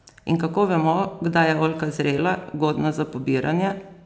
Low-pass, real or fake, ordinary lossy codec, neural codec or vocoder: none; real; none; none